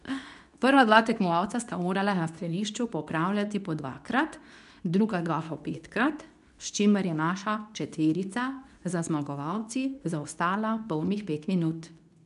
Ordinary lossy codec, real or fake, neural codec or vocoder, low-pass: none; fake; codec, 24 kHz, 0.9 kbps, WavTokenizer, medium speech release version 2; 10.8 kHz